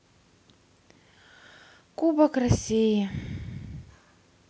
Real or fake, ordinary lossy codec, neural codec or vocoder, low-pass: real; none; none; none